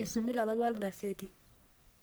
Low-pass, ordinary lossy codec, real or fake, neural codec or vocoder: none; none; fake; codec, 44.1 kHz, 1.7 kbps, Pupu-Codec